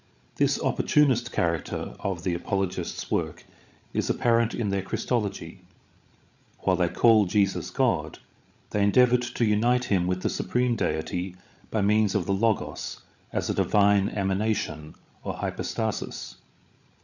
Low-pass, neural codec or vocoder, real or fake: 7.2 kHz; codec, 16 kHz, 16 kbps, FreqCodec, larger model; fake